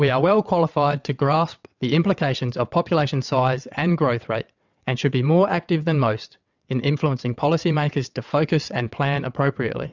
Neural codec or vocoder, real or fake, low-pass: vocoder, 22.05 kHz, 80 mel bands, WaveNeXt; fake; 7.2 kHz